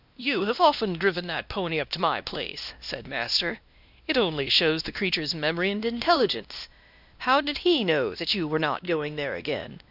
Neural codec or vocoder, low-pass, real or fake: codec, 16 kHz, 1 kbps, X-Codec, WavLM features, trained on Multilingual LibriSpeech; 5.4 kHz; fake